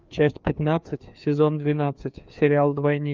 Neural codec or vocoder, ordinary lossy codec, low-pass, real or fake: codec, 16 kHz, 2 kbps, FreqCodec, larger model; Opus, 24 kbps; 7.2 kHz; fake